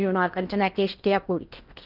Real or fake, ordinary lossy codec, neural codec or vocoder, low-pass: fake; Opus, 32 kbps; codec, 16 kHz in and 24 kHz out, 0.8 kbps, FocalCodec, streaming, 65536 codes; 5.4 kHz